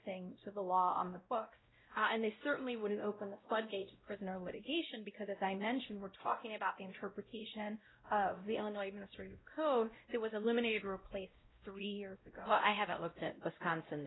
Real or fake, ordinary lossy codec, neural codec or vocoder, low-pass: fake; AAC, 16 kbps; codec, 16 kHz, 0.5 kbps, X-Codec, WavLM features, trained on Multilingual LibriSpeech; 7.2 kHz